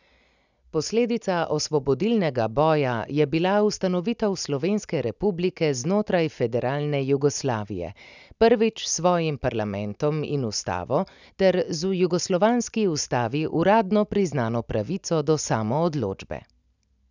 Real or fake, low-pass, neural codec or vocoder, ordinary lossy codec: real; 7.2 kHz; none; none